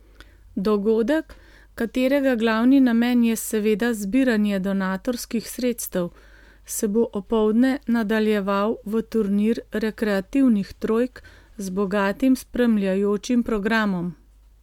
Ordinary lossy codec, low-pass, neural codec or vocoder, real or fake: MP3, 96 kbps; 19.8 kHz; none; real